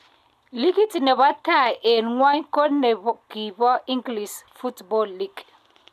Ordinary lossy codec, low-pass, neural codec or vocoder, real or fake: none; 14.4 kHz; none; real